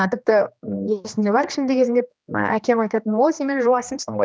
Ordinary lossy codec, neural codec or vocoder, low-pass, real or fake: none; codec, 16 kHz, 2 kbps, X-Codec, HuBERT features, trained on general audio; none; fake